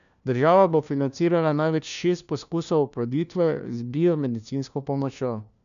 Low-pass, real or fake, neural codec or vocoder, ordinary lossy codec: 7.2 kHz; fake; codec, 16 kHz, 1 kbps, FunCodec, trained on LibriTTS, 50 frames a second; none